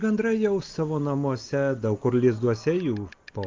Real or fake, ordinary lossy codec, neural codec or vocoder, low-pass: real; Opus, 32 kbps; none; 7.2 kHz